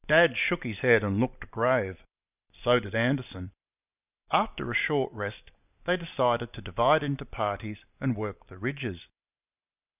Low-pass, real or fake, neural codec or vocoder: 3.6 kHz; real; none